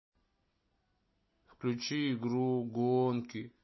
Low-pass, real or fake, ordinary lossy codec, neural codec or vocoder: 7.2 kHz; real; MP3, 24 kbps; none